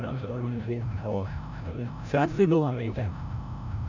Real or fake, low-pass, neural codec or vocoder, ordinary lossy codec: fake; 7.2 kHz; codec, 16 kHz, 0.5 kbps, FreqCodec, larger model; none